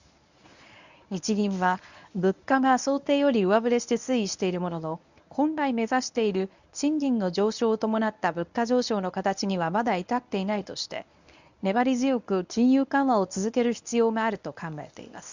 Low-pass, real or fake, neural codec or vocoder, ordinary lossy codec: 7.2 kHz; fake; codec, 24 kHz, 0.9 kbps, WavTokenizer, medium speech release version 1; none